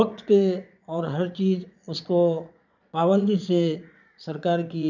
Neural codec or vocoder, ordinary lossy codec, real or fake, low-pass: vocoder, 22.05 kHz, 80 mel bands, Vocos; none; fake; 7.2 kHz